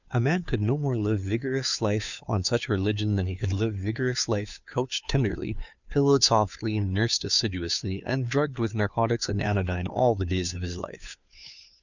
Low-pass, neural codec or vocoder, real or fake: 7.2 kHz; codec, 16 kHz, 2 kbps, FunCodec, trained on Chinese and English, 25 frames a second; fake